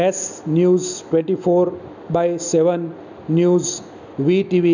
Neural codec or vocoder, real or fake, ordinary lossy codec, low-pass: none; real; none; 7.2 kHz